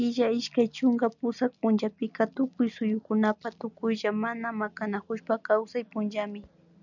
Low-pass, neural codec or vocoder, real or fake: 7.2 kHz; none; real